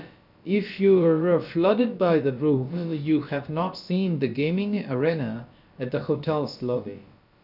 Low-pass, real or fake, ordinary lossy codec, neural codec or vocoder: 5.4 kHz; fake; none; codec, 16 kHz, about 1 kbps, DyCAST, with the encoder's durations